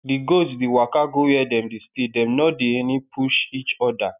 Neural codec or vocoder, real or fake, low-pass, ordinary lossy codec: none; real; 3.6 kHz; none